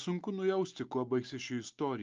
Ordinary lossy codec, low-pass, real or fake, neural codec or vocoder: Opus, 24 kbps; 7.2 kHz; real; none